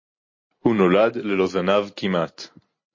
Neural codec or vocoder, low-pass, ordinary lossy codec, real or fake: none; 7.2 kHz; MP3, 32 kbps; real